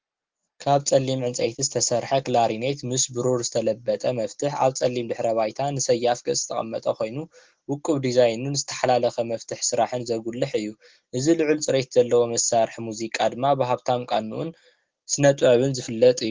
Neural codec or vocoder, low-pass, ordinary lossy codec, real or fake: none; 7.2 kHz; Opus, 16 kbps; real